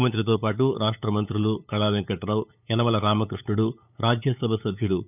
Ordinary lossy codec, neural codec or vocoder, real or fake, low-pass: none; codec, 16 kHz, 8 kbps, FunCodec, trained on LibriTTS, 25 frames a second; fake; 3.6 kHz